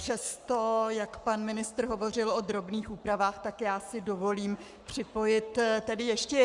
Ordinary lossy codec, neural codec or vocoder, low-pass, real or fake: Opus, 64 kbps; codec, 44.1 kHz, 7.8 kbps, Pupu-Codec; 10.8 kHz; fake